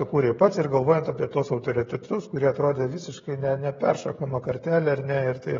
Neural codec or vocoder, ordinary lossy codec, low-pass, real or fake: codec, 16 kHz, 16 kbps, FreqCodec, smaller model; AAC, 24 kbps; 7.2 kHz; fake